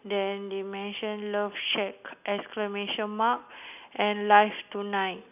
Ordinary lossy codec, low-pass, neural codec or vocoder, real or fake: none; 3.6 kHz; none; real